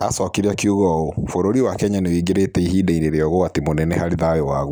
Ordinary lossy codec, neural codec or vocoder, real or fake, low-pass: none; none; real; none